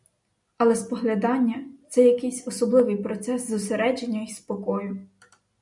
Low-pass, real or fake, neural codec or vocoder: 10.8 kHz; real; none